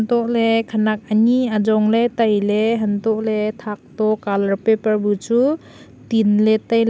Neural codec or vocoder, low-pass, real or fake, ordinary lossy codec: none; none; real; none